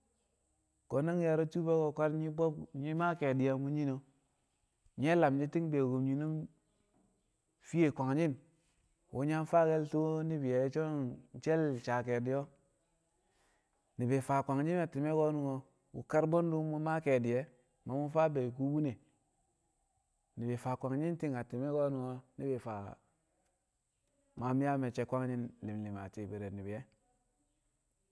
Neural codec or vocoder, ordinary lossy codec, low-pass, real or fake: none; none; none; real